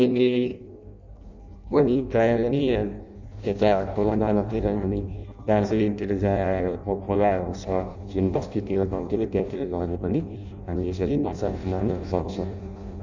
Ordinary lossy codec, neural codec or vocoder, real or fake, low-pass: none; codec, 16 kHz in and 24 kHz out, 0.6 kbps, FireRedTTS-2 codec; fake; 7.2 kHz